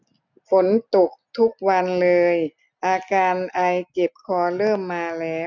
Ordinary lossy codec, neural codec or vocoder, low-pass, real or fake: none; none; 7.2 kHz; real